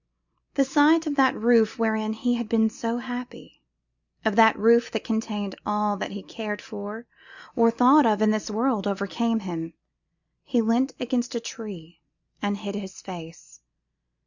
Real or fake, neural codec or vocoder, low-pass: real; none; 7.2 kHz